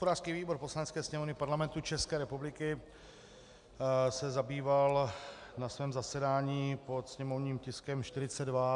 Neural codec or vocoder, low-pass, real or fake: none; 10.8 kHz; real